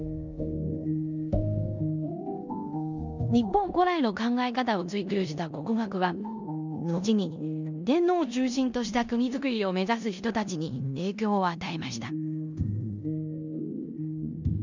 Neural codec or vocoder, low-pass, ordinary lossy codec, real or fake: codec, 16 kHz in and 24 kHz out, 0.9 kbps, LongCat-Audio-Codec, four codebook decoder; 7.2 kHz; none; fake